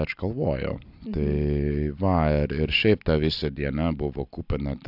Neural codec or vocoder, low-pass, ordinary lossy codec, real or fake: none; 5.4 kHz; AAC, 48 kbps; real